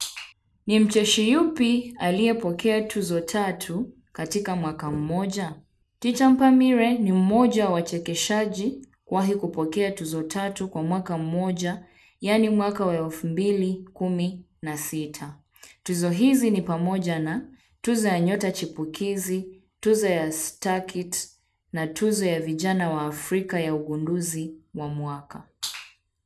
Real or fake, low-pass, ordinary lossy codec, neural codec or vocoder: real; none; none; none